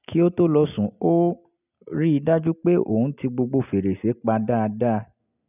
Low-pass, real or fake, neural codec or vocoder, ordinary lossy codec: 3.6 kHz; real; none; none